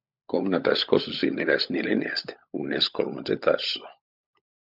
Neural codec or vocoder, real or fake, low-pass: codec, 16 kHz, 16 kbps, FunCodec, trained on LibriTTS, 50 frames a second; fake; 5.4 kHz